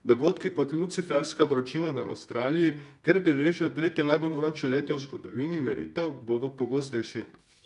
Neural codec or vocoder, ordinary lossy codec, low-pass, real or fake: codec, 24 kHz, 0.9 kbps, WavTokenizer, medium music audio release; none; 10.8 kHz; fake